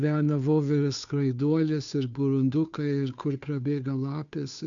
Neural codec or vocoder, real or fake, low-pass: codec, 16 kHz, 2 kbps, FunCodec, trained on Chinese and English, 25 frames a second; fake; 7.2 kHz